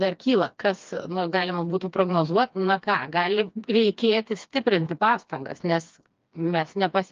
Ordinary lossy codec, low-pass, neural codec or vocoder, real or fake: Opus, 24 kbps; 7.2 kHz; codec, 16 kHz, 2 kbps, FreqCodec, smaller model; fake